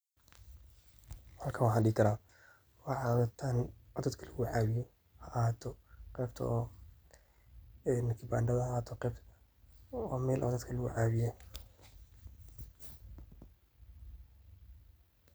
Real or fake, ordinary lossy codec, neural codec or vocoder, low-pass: real; none; none; none